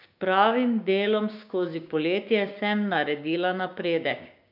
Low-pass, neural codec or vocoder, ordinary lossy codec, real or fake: 5.4 kHz; codec, 16 kHz, 6 kbps, DAC; none; fake